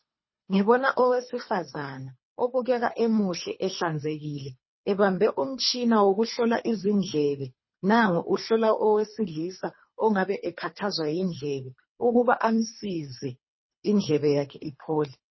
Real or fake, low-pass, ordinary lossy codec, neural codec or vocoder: fake; 7.2 kHz; MP3, 24 kbps; codec, 24 kHz, 3 kbps, HILCodec